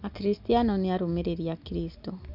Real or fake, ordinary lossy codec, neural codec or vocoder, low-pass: real; none; none; 5.4 kHz